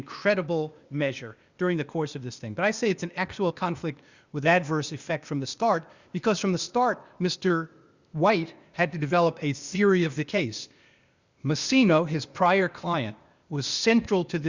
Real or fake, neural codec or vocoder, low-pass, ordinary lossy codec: fake; codec, 16 kHz, 0.8 kbps, ZipCodec; 7.2 kHz; Opus, 64 kbps